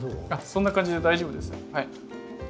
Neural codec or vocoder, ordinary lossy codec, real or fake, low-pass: none; none; real; none